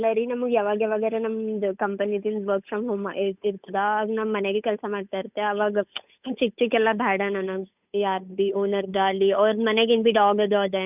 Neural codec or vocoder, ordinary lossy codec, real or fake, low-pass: codec, 16 kHz, 8 kbps, FunCodec, trained on Chinese and English, 25 frames a second; none; fake; 3.6 kHz